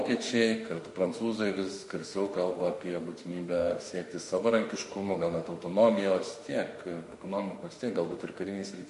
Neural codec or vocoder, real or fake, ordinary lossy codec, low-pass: autoencoder, 48 kHz, 32 numbers a frame, DAC-VAE, trained on Japanese speech; fake; MP3, 48 kbps; 14.4 kHz